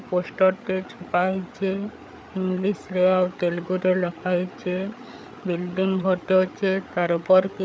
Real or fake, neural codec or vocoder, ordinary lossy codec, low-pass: fake; codec, 16 kHz, 8 kbps, FreqCodec, larger model; none; none